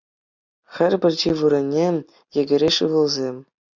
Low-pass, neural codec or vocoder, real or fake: 7.2 kHz; none; real